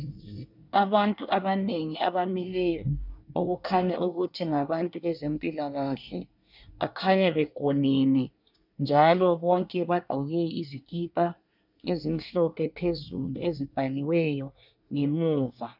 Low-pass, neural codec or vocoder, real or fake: 5.4 kHz; codec, 24 kHz, 1 kbps, SNAC; fake